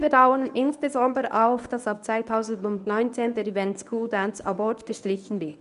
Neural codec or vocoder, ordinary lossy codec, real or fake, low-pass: codec, 24 kHz, 0.9 kbps, WavTokenizer, medium speech release version 1; none; fake; 10.8 kHz